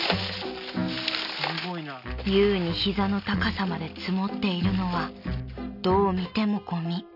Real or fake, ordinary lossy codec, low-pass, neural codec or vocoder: real; none; 5.4 kHz; none